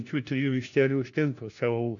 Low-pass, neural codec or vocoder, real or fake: 7.2 kHz; codec, 16 kHz, 1 kbps, FunCodec, trained on LibriTTS, 50 frames a second; fake